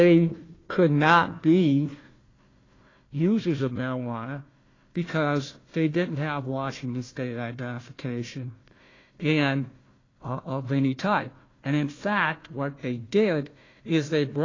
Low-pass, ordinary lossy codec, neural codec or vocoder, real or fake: 7.2 kHz; AAC, 32 kbps; codec, 16 kHz, 1 kbps, FunCodec, trained on Chinese and English, 50 frames a second; fake